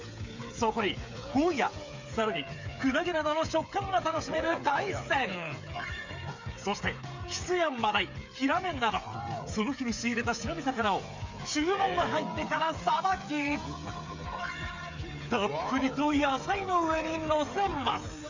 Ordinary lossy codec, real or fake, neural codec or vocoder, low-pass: MP3, 64 kbps; fake; codec, 16 kHz, 8 kbps, FreqCodec, smaller model; 7.2 kHz